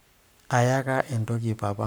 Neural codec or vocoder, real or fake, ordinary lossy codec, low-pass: codec, 44.1 kHz, 7.8 kbps, Pupu-Codec; fake; none; none